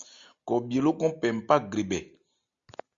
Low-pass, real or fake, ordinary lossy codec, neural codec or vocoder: 7.2 kHz; real; Opus, 64 kbps; none